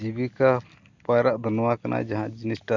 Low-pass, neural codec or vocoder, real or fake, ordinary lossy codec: 7.2 kHz; none; real; Opus, 64 kbps